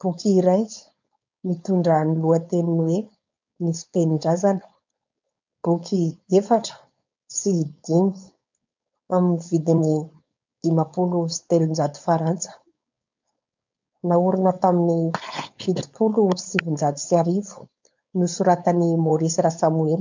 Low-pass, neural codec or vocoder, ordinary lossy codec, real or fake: 7.2 kHz; codec, 16 kHz, 4.8 kbps, FACodec; MP3, 64 kbps; fake